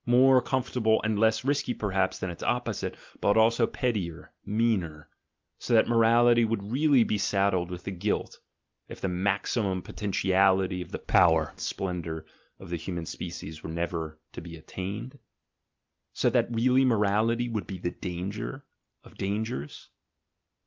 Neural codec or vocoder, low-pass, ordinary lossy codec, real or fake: none; 7.2 kHz; Opus, 24 kbps; real